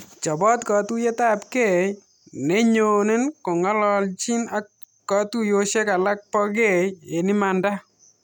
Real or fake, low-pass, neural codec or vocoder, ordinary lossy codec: real; 19.8 kHz; none; none